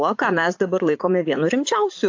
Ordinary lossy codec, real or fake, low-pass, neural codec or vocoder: AAC, 48 kbps; fake; 7.2 kHz; vocoder, 44.1 kHz, 80 mel bands, Vocos